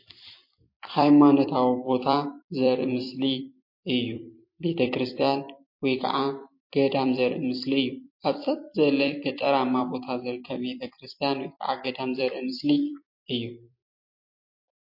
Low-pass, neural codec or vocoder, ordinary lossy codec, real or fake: 5.4 kHz; vocoder, 44.1 kHz, 128 mel bands every 256 samples, BigVGAN v2; MP3, 32 kbps; fake